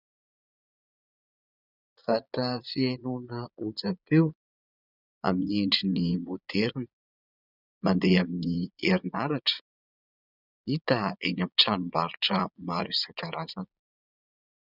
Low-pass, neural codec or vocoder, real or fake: 5.4 kHz; vocoder, 22.05 kHz, 80 mel bands, Vocos; fake